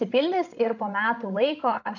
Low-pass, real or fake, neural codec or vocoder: 7.2 kHz; fake; codec, 16 kHz, 16 kbps, FreqCodec, larger model